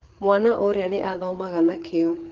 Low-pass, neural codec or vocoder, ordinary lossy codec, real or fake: 7.2 kHz; codec, 16 kHz, 4 kbps, FreqCodec, larger model; Opus, 16 kbps; fake